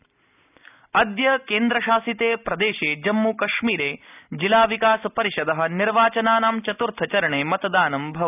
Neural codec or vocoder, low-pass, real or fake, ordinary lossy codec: none; 3.6 kHz; real; none